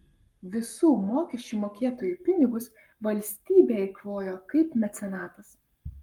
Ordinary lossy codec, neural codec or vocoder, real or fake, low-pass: Opus, 32 kbps; codec, 44.1 kHz, 7.8 kbps, Pupu-Codec; fake; 19.8 kHz